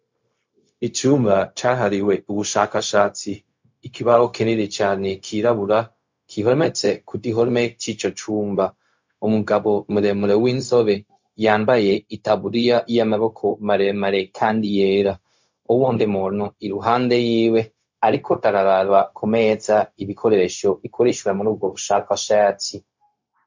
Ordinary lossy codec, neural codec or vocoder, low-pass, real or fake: MP3, 48 kbps; codec, 16 kHz, 0.4 kbps, LongCat-Audio-Codec; 7.2 kHz; fake